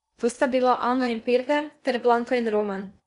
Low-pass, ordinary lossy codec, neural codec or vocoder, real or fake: 10.8 kHz; none; codec, 16 kHz in and 24 kHz out, 0.8 kbps, FocalCodec, streaming, 65536 codes; fake